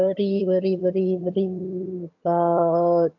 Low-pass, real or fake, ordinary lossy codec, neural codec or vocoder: 7.2 kHz; fake; AAC, 48 kbps; vocoder, 22.05 kHz, 80 mel bands, HiFi-GAN